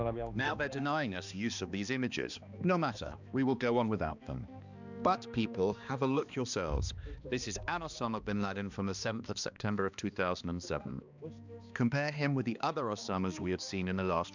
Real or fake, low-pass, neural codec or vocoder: fake; 7.2 kHz; codec, 16 kHz, 2 kbps, X-Codec, HuBERT features, trained on balanced general audio